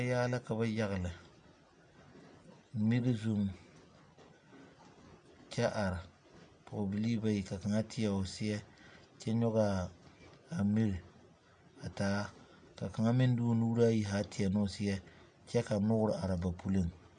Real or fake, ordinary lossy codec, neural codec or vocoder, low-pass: real; MP3, 64 kbps; none; 9.9 kHz